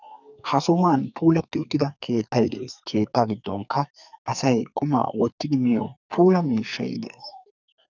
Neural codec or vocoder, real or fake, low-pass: codec, 44.1 kHz, 2.6 kbps, SNAC; fake; 7.2 kHz